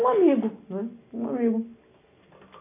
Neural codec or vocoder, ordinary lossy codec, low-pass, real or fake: none; MP3, 24 kbps; 3.6 kHz; real